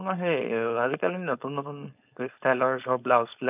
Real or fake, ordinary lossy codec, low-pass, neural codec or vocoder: fake; none; 3.6 kHz; codec, 16 kHz, 4.8 kbps, FACodec